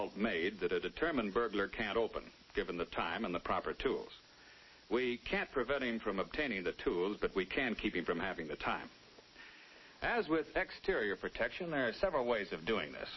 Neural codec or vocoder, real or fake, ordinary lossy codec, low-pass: none; real; MP3, 24 kbps; 7.2 kHz